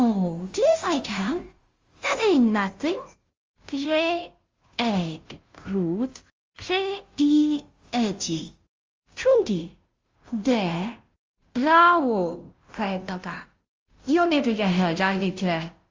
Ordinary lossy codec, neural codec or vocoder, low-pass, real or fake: Opus, 32 kbps; codec, 16 kHz, 0.5 kbps, FunCodec, trained on Chinese and English, 25 frames a second; 7.2 kHz; fake